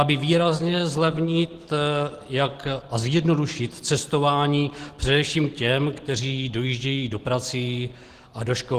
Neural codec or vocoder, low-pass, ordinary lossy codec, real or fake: none; 14.4 kHz; Opus, 16 kbps; real